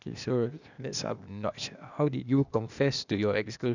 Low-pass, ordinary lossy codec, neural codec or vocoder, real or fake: 7.2 kHz; none; codec, 16 kHz, 0.8 kbps, ZipCodec; fake